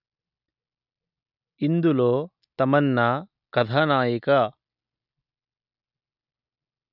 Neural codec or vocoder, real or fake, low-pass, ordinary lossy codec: none; real; 5.4 kHz; none